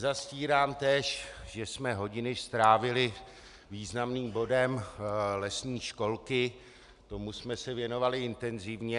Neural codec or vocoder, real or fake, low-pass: none; real; 10.8 kHz